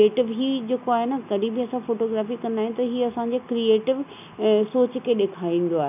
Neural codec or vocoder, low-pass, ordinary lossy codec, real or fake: none; 3.6 kHz; none; real